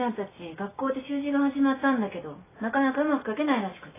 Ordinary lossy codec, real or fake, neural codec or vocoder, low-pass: AAC, 16 kbps; real; none; 3.6 kHz